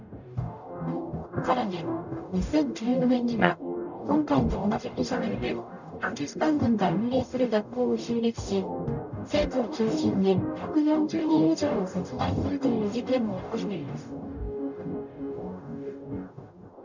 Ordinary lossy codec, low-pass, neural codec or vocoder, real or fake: none; 7.2 kHz; codec, 44.1 kHz, 0.9 kbps, DAC; fake